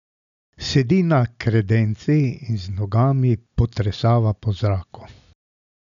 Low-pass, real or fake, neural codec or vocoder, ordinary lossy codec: 7.2 kHz; real; none; none